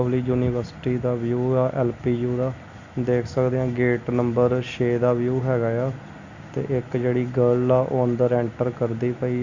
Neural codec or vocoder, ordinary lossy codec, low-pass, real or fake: none; Opus, 64 kbps; 7.2 kHz; real